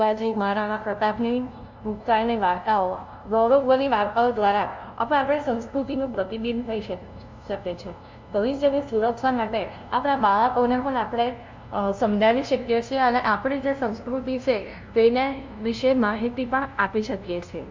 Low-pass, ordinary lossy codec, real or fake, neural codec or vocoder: 7.2 kHz; MP3, 64 kbps; fake; codec, 16 kHz, 0.5 kbps, FunCodec, trained on LibriTTS, 25 frames a second